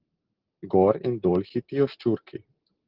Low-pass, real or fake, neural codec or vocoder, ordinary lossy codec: 5.4 kHz; real; none; Opus, 16 kbps